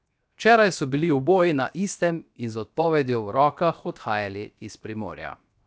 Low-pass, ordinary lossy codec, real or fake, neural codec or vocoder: none; none; fake; codec, 16 kHz, 0.7 kbps, FocalCodec